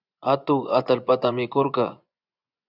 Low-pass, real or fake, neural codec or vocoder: 5.4 kHz; fake; vocoder, 24 kHz, 100 mel bands, Vocos